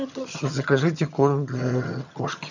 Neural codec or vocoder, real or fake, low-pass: vocoder, 22.05 kHz, 80 mel bands, HiFi-GAN; fake; 7.2 kHz